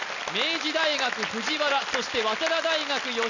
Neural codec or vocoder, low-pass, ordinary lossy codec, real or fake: none; 7.2 kHz; none; real